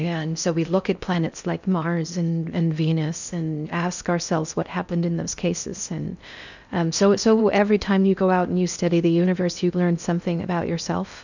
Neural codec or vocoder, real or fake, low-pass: codec, 16 kHz in and 24 kHz out, 0.6 kbps, FocalCodec, streaming, 4096 codes; fake; 7.2 kHz